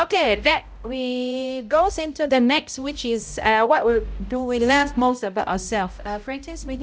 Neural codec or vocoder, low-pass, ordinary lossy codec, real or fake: codec, 16 kHz, 0.5 kbps, X-Codec, HuBERT features, trained on balanced general audio; none; none; fake